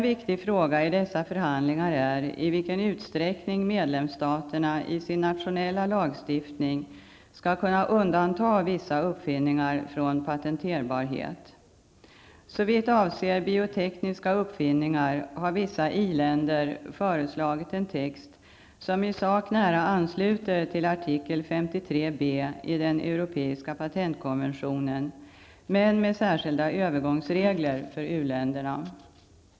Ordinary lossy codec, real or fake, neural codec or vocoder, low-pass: none; real; none; none